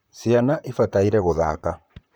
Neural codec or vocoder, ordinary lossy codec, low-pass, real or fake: vocoder, 44.1 kHz, 128 mel bands, Pupu-Vocoder; none; none; fake